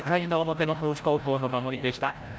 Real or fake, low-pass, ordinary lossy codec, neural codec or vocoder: fake; none; none; codec, 16 kHz, 0.5 kbps, FreqCodec, larger model